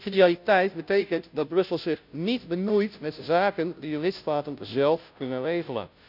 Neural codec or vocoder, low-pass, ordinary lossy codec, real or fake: codec, 16 kHz, 0.5 kbps, FunCodec, trained on Chinese and English, 25 frames a second; 5.4 kHz; none; fake